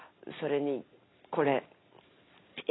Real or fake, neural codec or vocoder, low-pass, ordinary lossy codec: real; none; 7.2 kHz; AAC, 16 kbps